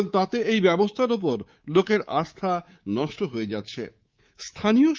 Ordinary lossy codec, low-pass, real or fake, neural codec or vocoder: Opus, 32 kbps; 7.2 kHz; fake; codec, 24 kHz, 3.1 kbps, DualCodec